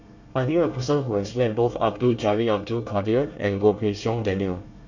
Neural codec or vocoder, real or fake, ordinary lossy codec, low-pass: codec, 24 kHz, 1 kbps, SNAC; fake; none; 7.2 kHz